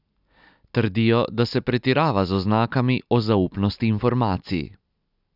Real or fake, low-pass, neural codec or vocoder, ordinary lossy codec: real; 5.4 kHz; none; none